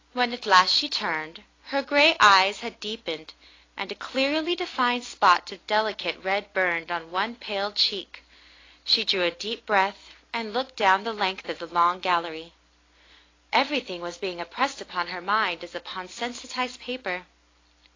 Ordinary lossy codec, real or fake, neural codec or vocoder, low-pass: AAC, 32 kbps; real; none; 7.2 kHz